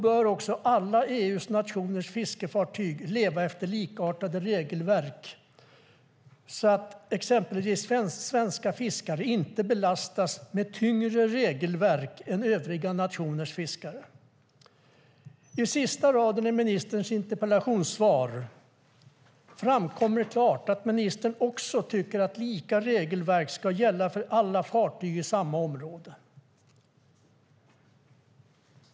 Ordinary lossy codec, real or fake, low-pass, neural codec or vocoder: none; real; none; none